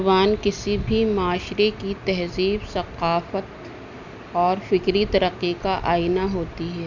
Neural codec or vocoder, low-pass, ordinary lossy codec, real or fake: none; 7.2 kHz; none; real